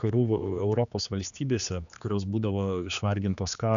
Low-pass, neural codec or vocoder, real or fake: 7.2 kHz; codec, 16 kHz, 2 kbps, X-Codec, HuBERT features, trained on general audio; fake